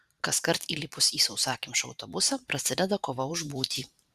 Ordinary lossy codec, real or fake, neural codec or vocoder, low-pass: Opus, 64 kbps; fake; vocoder, 44.1 kHz, 128 mel bands every 512 samples, BigVGAN v2; 14.4 kHz